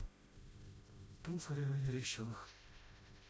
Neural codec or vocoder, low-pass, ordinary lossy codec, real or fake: codec, 16 kHz, 0.5 kbps, FreqCodec, smaller model; none; none; fake